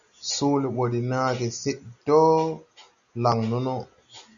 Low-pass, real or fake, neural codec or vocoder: 7.2 kHz; real; none